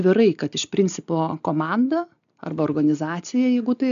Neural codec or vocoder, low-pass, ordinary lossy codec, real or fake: none; 7.2 kHz; MP3, 96 kbps; real